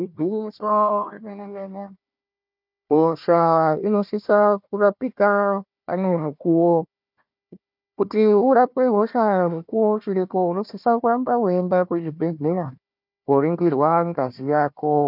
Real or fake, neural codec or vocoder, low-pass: fake; codec, 16 kHz, 1 kbps, FunCodec, trained on Chinese and English, 50 frames a second; 5.4 kHz